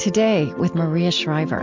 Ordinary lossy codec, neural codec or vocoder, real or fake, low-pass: MP3, 64 kbps; none; real; 7.2 kHz